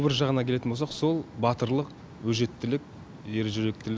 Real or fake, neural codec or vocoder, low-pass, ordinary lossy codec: real; none; none; none